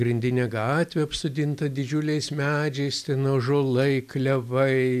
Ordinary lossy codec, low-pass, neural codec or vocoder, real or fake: AAC, 96 kbps; 14.4 kHz; none; real